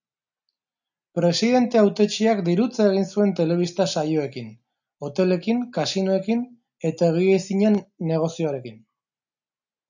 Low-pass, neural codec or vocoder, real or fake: 7.2 kHz; none; real